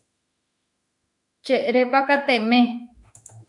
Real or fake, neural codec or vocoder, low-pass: fake; autoencoder, 48 kHz, 32 numbers a frame, DAC-VAE, trained on Japanese speech; 10.8 kHz